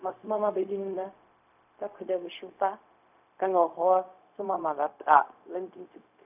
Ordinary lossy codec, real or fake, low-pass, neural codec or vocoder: none; fake; 3.6 kHz; codec, 16 kHz, 0.4 kbps, LongCat-Audio-Codec